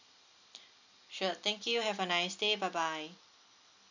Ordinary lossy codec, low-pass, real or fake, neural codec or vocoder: none; 7.2 kHz; real; none